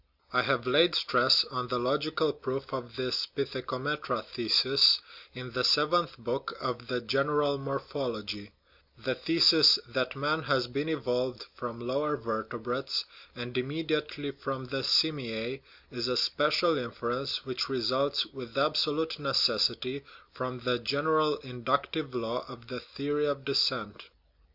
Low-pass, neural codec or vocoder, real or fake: 5.4 kHz; none; real